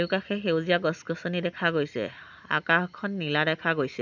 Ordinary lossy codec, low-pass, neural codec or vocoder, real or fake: none; 7.2 kHz; none; real